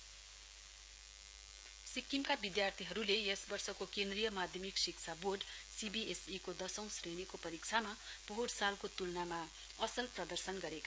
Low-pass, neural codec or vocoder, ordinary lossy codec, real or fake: none; codec, 16 kHz, 16 kbps, FreqCodec, smaller model; none; fake